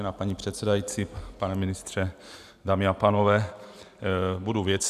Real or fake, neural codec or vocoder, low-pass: real; none; 14.4 kHz